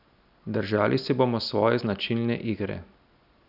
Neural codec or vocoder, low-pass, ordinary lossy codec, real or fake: none; 5.4 kHz; none; real